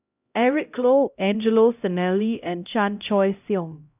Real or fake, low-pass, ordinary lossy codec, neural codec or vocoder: fake; 3.6 kHz; none; codec, 16 kHz, 0.5 kbps, X-Codec, HuBERT features, trained on LibriSpeech